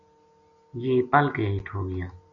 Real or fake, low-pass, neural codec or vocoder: real; 7.2 kHz; none